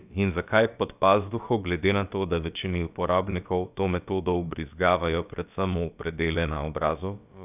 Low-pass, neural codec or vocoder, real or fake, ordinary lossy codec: 3.6 kHz; codec, 16 kHz, about 1 kbps, DyCAST, with the encoder's durations; fake; none